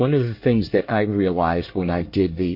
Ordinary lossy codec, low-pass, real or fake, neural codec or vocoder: MP3, 32 kbps; 5.4 kHz; fake; codec, 24 kHz, 1 kbps, SNAC